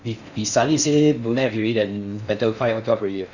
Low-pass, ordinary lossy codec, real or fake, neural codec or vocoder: 7.2 kHz; none; fake; codec, 16 kHz in and 24 kHz out, 0.6 kbps, FocalCodec, streaming, 4096 codes